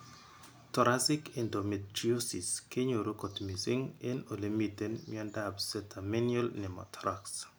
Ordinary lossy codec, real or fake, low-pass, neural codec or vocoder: none; real; none; none